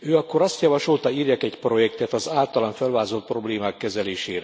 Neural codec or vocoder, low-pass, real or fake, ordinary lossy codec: none; none; real; none